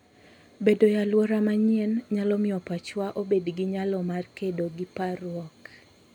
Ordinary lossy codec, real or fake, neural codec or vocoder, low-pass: none; real; none; 19.8 kHz